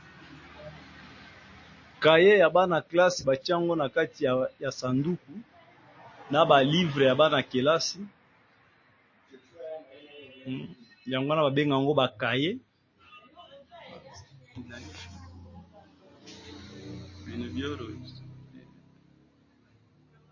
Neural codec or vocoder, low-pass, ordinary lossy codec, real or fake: none; 7.2 kHz; MP3, 32 kbps; real